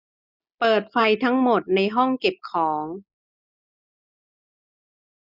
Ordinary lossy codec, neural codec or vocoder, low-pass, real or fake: none; none; 5.4 kHz; real